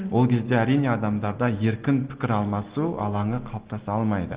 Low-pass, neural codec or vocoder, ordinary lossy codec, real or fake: 3.6 kHz; none; Opus, 16 kbps; real